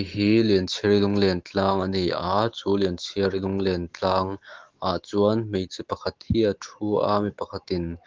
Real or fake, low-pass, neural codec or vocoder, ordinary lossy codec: fake; 7.2 kHz; autoencoder, 48 kHz, 128 numbers a frame, DAC-VAE, trained on Japanese speech; Opus, 16 kbps